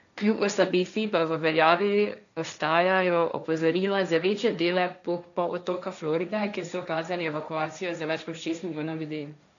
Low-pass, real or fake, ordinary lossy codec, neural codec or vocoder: 7.2 kHz; fake; none; codec, 16 kHz, 1.1 kbps, Voila-Tokenizer